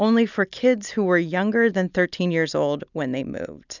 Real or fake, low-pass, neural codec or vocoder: real; 7.2 kHz; none